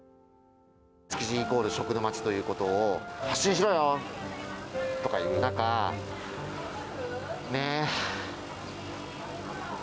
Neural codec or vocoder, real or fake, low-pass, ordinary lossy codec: none; real; none; none